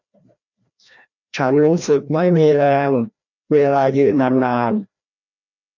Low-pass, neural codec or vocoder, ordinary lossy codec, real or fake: 7.2 kHz; codec, 16 kHz, 1 kbps, FreqCodec, larger model; none; fake